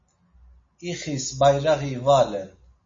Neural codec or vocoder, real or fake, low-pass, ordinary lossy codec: none; real; 7.2 kHz; MP3, 32 kbps